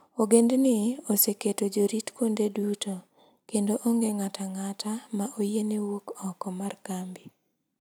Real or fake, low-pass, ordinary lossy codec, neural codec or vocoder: real; none; none; none